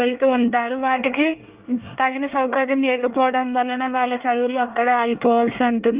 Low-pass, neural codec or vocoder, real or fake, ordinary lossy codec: 3.6 kHz; codec, 24 kHz, 1 kbps, SNAC; fake; Opus, 24 kbps